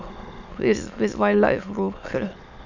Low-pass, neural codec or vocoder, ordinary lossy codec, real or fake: 7.2 kHz; autoencoder, 22.05 kHz, a latent of 192 numbers a frame, VITS, trained on many speakers; none; fake